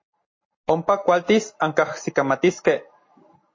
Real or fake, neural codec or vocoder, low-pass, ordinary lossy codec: real; none; 7.2 kHz; MP3, 32 kbps